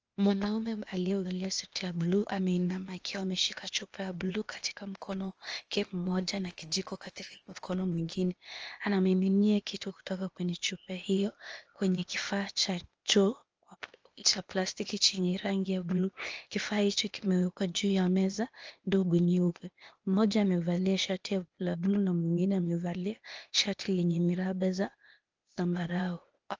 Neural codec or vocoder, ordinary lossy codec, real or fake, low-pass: codec, 16 kHz, 0.8 kbps, ZipCodec; Opus, 24 kbps; fake; 7.2 kHz